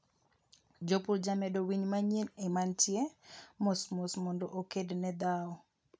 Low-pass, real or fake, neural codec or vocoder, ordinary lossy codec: none; real; none; none